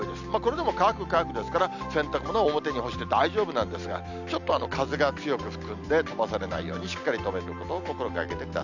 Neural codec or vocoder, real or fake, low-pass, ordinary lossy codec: none; real; 7.2 kHz; none